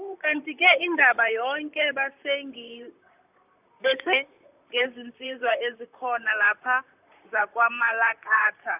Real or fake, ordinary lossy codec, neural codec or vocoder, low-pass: fake; none; vocoder, 44.1 kHz, 128 mel bands, Pupu-Vocoder; 3.6 kHz